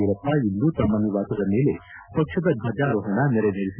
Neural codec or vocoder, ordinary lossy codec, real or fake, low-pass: none; none; real; 3.6 kHz